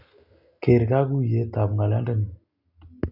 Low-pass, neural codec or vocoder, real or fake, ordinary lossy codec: 5.4 kHz; none; real; none